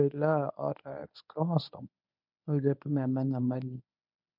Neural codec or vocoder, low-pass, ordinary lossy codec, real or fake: codec, 24 kHz, 0.9 kbps, WavTokenizer, medium speech release version 1; 5.4 kHz; MP3, 48 kbps; fake